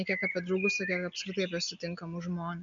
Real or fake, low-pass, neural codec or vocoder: real; 7.2 kHz; none